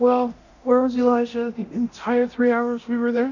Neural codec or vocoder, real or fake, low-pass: codec, 24 kHz, 0.9 kbps, DualCodec; fake; 7.2 kHz